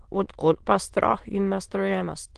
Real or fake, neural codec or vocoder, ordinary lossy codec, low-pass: fake; autoencoder, 22.05 kHz, a latent of 192 numbers a frame, VITS, trained on many speakers; Opus, 16 kbps; 9.9 kHz